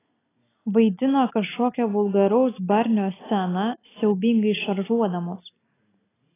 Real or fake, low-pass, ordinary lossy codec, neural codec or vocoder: real; 3.6 kHz; AAC, 16 kbps; none